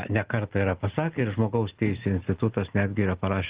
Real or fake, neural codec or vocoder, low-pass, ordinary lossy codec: real; none; 3.6 kHz; Opus, 16 kbps